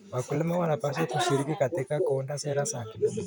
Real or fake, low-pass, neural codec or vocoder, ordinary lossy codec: fake; none; vocoder, 44.1 kHz, 128 mel bands, Pupu-Vocoder; none